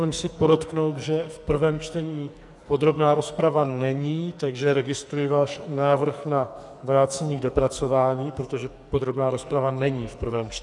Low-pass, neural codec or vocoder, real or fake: 10.8 kHz; codec, 44.1 kHz, 2.6 kbps, SNAC; fake